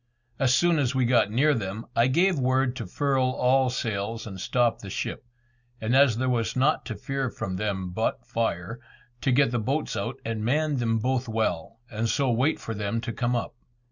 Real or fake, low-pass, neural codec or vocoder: real; 7.2 kHz; none